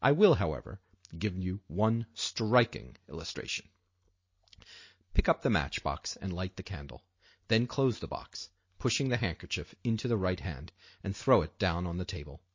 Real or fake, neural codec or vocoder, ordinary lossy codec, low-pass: real; none; MP3, 32 kbps; 7.2 kHz